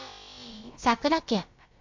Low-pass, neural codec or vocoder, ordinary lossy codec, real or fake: 7.2 kHz; codec, 16 kHz, about 1 kbps, DyCAST, with the encoder's durations; MP3, 64 kbps; fake